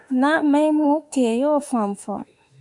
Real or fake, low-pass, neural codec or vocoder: fake; 10.8 kHz; autoencoder, 48 kHz, 32 numbers a frame, DAC-VAE, trained on Japanese speech